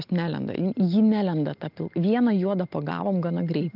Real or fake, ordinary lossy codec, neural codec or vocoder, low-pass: real; Opus, 24 kbps; none; 5.4 kHz